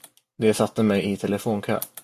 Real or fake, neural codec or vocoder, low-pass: real; none; 14.4 kHz